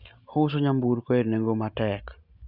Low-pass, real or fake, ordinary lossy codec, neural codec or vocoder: 5.4 kHz; real; Opus, 64 kbps; none